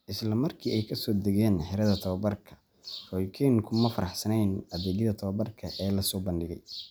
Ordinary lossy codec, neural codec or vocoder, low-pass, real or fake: none; none; none; real